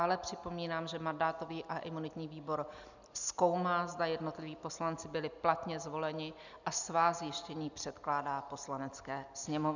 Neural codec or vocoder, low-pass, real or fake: none; 7.2 kHz; real